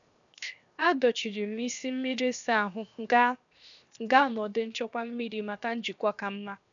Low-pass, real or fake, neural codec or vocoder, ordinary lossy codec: 7.2 kHz; fake; codec, 16 kHz, 0.7 kbps, FocalCodec; none